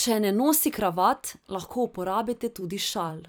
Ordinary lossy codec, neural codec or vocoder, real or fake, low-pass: none; vocoder, 44.1 kHz, 128 mel bands every 256 samples, BigVGAN v2; fake; none